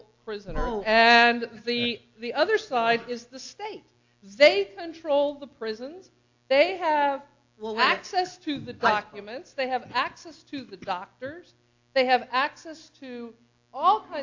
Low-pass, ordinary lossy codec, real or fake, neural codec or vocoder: 7.2 kHz; AAC, 48 kbps; real; none